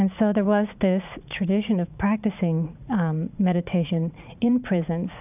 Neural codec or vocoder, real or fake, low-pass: vocoder, 44.1 kHz, 80 mel bands, Vocos; fake; 3.6 kHz